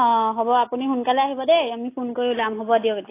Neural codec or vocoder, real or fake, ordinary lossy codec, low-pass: none; real; AAC, 24 kbps; 3.6 kHz